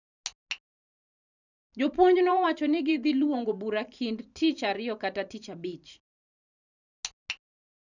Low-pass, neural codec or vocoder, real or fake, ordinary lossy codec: 7.2 kHz; vocoder, 44.1 kHz, 128 mel bands every 512 samples, BigVGAN v2; fake; none